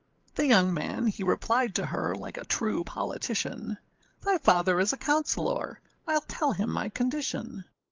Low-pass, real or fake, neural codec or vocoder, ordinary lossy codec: 7.2 kHz; fake; codec, 16 kHz, 8 kbps, FreqCodec, larger model; Opus, 32 kbps